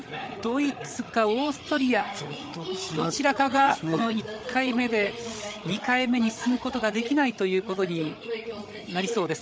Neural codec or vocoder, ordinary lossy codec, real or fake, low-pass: codec, 16 kHz, 8 kbps, FreqCodec, larger model; none; fake; none